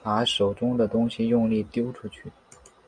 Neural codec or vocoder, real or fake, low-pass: none; real; 9.9 kHz